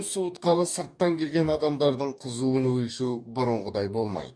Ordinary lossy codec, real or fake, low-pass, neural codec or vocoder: none; fake; 9.9 kHz; codec, 44.1 kHz, 2.6 kbps, DAC